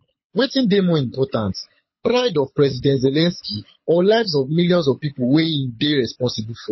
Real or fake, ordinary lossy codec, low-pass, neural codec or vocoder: fake; MP3, 24 kbps; 7.2 kHz; codec, 24 kHz, 6 kbps, HILCodec